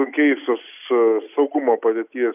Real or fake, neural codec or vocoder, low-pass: real; none; 3.6 kHz